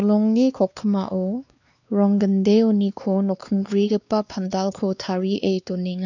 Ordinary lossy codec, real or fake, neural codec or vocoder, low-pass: none; fake; codec, 16 kHz, 2 kbps, X-Codec, WavLM features, trained on Multilingual LibriSpeech; 7.2 kHz